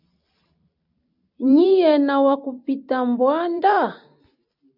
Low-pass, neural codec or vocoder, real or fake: 5.4 kHz; vocoder, 44.1 kHz, 128 mel bands every 256 samples, BigVGAN v2; fake